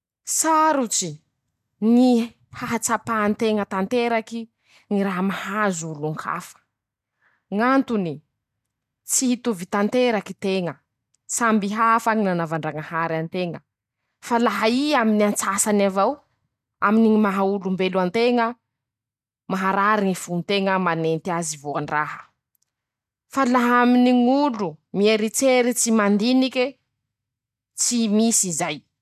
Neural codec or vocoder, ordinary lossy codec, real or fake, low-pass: none; none; real; 14.4 kHz